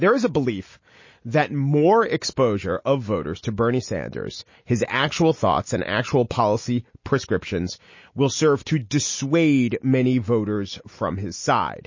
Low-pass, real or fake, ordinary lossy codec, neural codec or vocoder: 7.2 kHz; real; MP3, 32 kbps; none